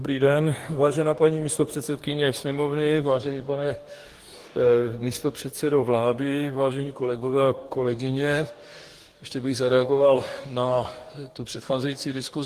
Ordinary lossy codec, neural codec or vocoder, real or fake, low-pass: Opus, 24 kbps; codec, 44.1 kHz, 2.6 kbps, DAC; fake; 14.4 kHz